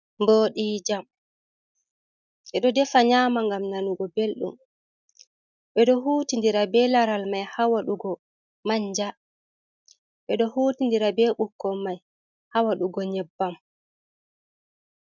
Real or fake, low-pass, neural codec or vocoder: real; 7.2 kHz; none